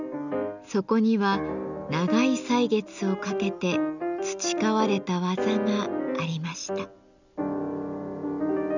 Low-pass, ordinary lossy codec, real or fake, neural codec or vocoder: 7.2 kHz; none; real; none